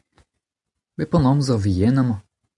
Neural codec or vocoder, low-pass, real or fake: none; 10.8 kHz; real